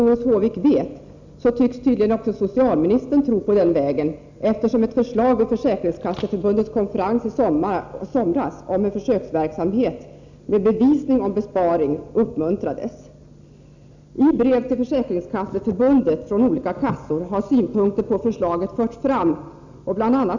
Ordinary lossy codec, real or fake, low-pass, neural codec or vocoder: none; real; 7.2 kHz; none